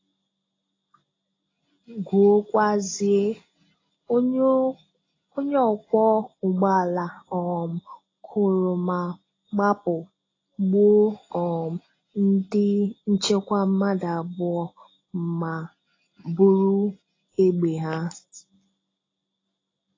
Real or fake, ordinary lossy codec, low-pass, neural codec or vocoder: real; AAC, 32 kbps; 7.2 kHz; none